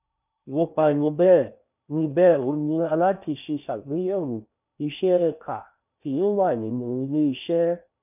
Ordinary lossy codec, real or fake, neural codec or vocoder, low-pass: none; fake; codec, 16 kHz in and 24 kHz out, 0.6 kbps, FocalCodec, streaming, 4096 codes; 3.6 kHz